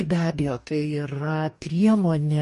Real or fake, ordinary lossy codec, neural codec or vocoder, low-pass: fake; MP3, 48 kbps; codec, 44.1 kHz, 2.6 kbps, DAC; 14.4 kHz